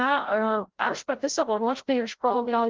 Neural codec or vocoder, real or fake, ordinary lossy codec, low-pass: codec, 16 kHz, 0.5 kbps, FreqCodec, larger model; fake; Opus, 16 kbps; 7.2 kHz